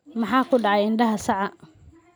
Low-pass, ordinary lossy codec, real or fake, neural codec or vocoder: none; none; real; none